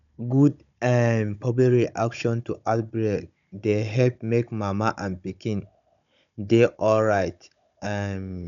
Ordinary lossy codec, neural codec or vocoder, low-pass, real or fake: none; codec, 16 kHz, 16 kbps, FunCodec, trained on Chinese and English, 50 frames a second; 7.2 kHz; fake